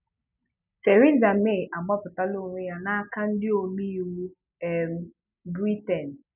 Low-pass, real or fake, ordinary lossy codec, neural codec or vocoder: 3.6 kHz; real; none; none